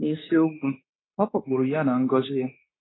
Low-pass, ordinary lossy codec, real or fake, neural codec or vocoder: 7.2 kHz; AAC, 16 kbps; fake; codec, 16 kHz, 0.9 kbps, LongCat-Audio-Codec